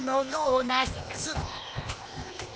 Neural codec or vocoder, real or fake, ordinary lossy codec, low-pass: codec, 16 kHz, 0.8 kbps, ZipCodec; fake; none; none